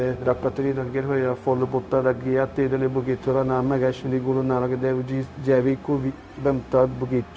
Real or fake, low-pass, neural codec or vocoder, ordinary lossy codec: fake; none; codec, 16 kHz, 0.4 kbps, LongCat-Audio-Codec; none